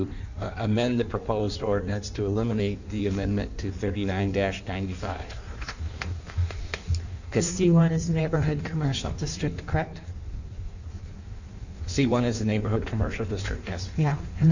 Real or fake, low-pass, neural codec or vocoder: fake; 7.2 kHz; codec, 16 kHz in and 24 kHz out, 1.1 kbps, FireRedTTS-2 codec